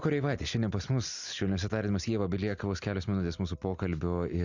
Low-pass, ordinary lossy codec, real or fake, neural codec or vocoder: 7.2 kHz; Opus, 64 kbps; real; none